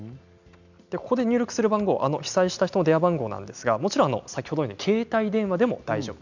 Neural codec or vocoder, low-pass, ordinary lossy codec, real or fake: none; 7.2 kHz; none; real